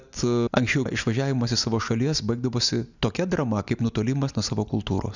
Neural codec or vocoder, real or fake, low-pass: none; real; 7.2 kHz